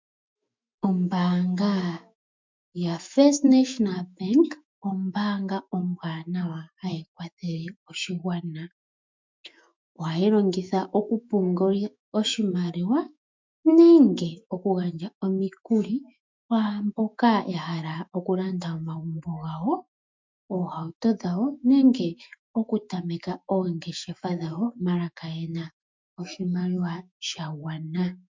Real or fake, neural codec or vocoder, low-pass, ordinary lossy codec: fake; autoencoder, 48 kHz, 128 numbers a frame, DAC-VAE, trained on Japanese speech; 7.2 kHz; MP3, 64 kbps